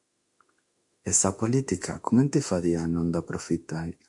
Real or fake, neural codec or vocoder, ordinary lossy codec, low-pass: fake; autoencoder, 48 kHz, 32 numbers a frame, DAC-VAE, trained on Japanese speech; MP3, 64 kbps; 10.8 kHz